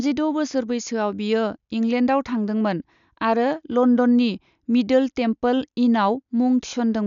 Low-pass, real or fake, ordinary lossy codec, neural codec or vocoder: 7.2 kHz; real; none; none